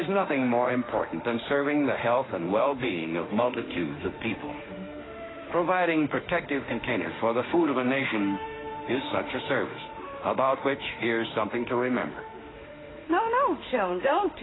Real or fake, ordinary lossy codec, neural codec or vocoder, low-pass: fake; AAC, 16 kbps; autoencoder, 48 kHz, 32 numbers a frame, DAC-VAE, trained on Japanese speech; 7.2 kHz